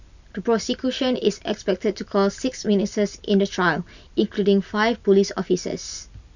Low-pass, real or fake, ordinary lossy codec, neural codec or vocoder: 7.2 kHz; real; none; none